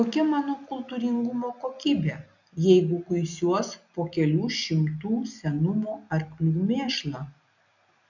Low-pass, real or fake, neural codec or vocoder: 7.2 kHz; real; none